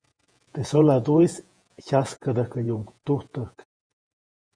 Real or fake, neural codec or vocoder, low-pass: fake; vocoder, 48 kHz, 128 mel bands, Vocos; 9.9 kHz